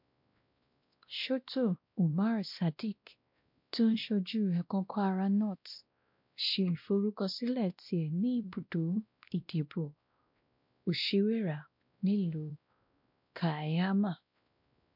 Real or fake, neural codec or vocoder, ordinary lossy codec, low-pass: fake; codec, 16 kHz, 1 kbps, X-Codec, WavLM features, trained on Multilingual LibriSpeech; MP3, 48 kbps; 5.4 kHz